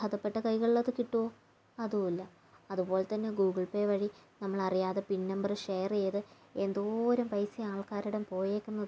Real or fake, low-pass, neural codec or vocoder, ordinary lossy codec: real; none; none; none